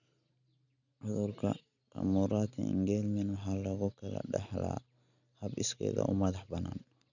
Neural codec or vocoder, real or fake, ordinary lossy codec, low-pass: none; real; none; 7.2 kHz